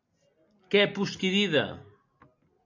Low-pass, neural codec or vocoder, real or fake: 7.2 kHz; none; real